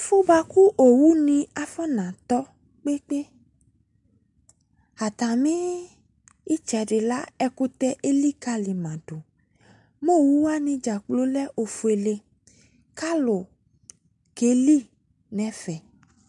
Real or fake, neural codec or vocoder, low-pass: real; none; 10.8 kHz